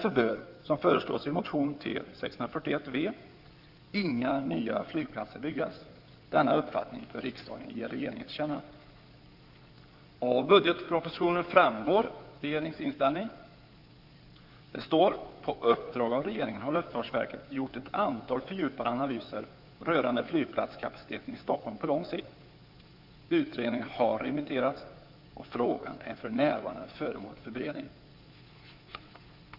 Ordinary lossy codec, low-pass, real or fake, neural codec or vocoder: none; 5.4 kHz; fake; codec, 16 kHz in and 24 kHz out, 2.2 kbps, FireRedTTS-2 codec